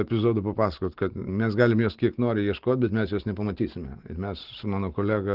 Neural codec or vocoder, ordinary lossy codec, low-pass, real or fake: none; Opus, 16 kbps; 5.4 kHz; real